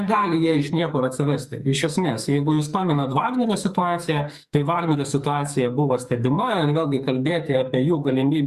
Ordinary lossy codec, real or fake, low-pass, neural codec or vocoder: Opus, 64 kbps; fake; 14.4 kHz; codec, 44.1 kHz, 2.6 kbps, SNAC